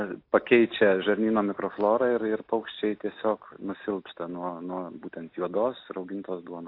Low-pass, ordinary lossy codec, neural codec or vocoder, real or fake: 5.4 kHz; AAC, 32 kbps; none; real